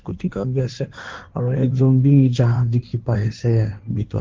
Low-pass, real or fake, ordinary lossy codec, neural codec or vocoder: 7.2 kHz; fake; Opus, 16 kbps; codec, 16 kHz in and 24 kHz out, 1.1 kbps, FireRedTTS-2 codec